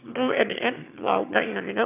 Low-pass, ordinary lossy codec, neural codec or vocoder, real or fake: 3.6 kHz; none; autoencoder, 22.05 kHz, a latent of 192 numbers a frame, VITS, trained on one speaker; fake